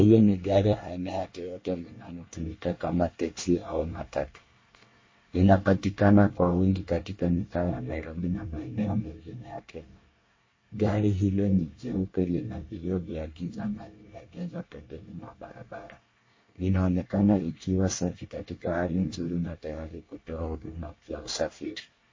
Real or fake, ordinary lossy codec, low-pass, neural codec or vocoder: fake; MP3, 32 kbps; 7.2 kHz; codec, 24 kHz, 1 kbps, SNAC